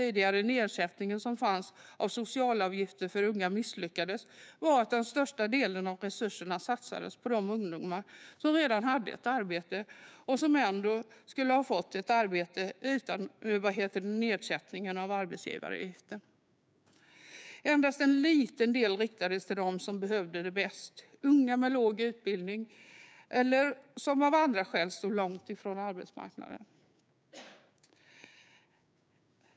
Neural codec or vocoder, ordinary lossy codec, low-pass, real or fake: codec, 16 kHz, 6 kbps, DAC; none; none; fake